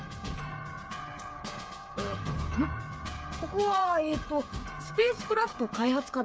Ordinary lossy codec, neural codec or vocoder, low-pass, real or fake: none; codec, 16 kHz, 8 kbps, FreqCodec, smaller model; none; fake